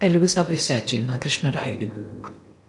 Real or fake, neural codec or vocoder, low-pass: fake; codec, 16 kHz in and 24 kHz out, 0.8 kbps, FocalCodec, streaming, 65536 codes; 10.8 kHz